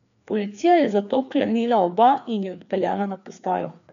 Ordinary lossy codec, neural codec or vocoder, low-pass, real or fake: none; codec, 16 kHz, 2 kbps, FreqCodec, larger model; 7.2 kHz; fake